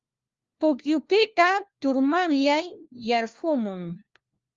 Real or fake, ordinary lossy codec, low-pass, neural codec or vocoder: fake; Opus, 32 kbps; 7.2 kHz; codec, 16 kHz, 1 kbps, FunCodec, trained on LibriTTS, 50 frames a second